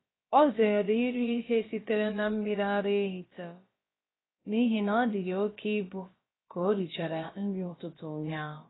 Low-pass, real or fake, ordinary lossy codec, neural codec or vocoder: 7.2 kHz; fake; AAC, 16 kbps; codec, 16 kHz, about 1 kbps, DyCAST, with the encoder's durations